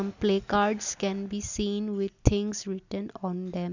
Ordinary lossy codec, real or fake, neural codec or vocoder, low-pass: none; real; none; 7.2 kHz